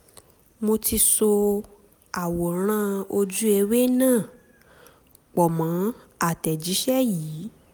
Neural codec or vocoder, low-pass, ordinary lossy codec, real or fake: none; none; none; real